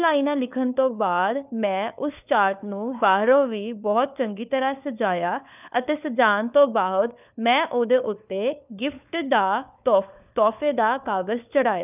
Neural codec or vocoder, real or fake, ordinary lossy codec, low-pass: codec, 16 kHz, 4 kbps, FunCodec, trained on LibriTTS, 50 frames a second; fake; none; 3.6 kHz